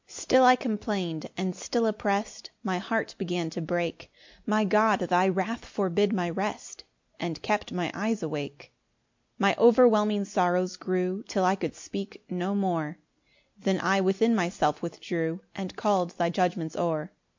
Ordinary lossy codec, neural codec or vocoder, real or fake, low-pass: MP3, 48 kbps; none; real; 7.2 kHz